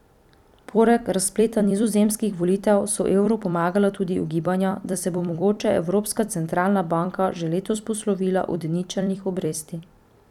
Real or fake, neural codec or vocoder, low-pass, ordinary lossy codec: fake; vocoder, 44.1 kHz, 128 mel bands every 256 samples, BigVGAN v2; 19.8 kHz; none